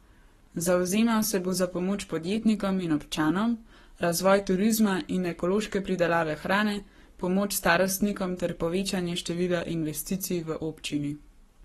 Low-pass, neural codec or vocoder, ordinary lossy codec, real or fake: 19.8 kHz; codec, 44.1 kHz, 7.8 kbps, Pupu-Codec; AAC, 32 kbps; fake